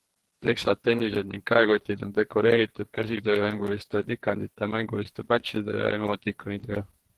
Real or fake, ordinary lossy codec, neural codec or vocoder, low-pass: fake; Opus, 16 kbps; codec, 44.1 kHz, 2.6 kbps, SNAC; 14.4 kHz